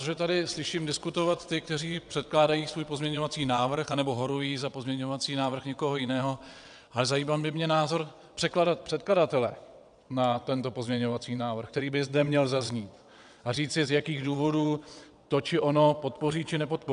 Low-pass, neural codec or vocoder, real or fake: 9.9 kHz; vocoder, 22.05 kHz, 80 mel bands, WaveNeXt; fake